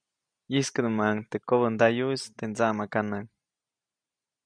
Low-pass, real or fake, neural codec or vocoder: 9.9 kHz; real; none